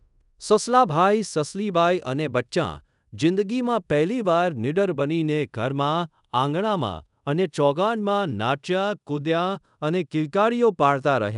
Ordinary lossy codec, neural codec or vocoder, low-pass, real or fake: none; codec, 24 kHz, 0.5 kbps, DualCodec; 10.8 kHz; fake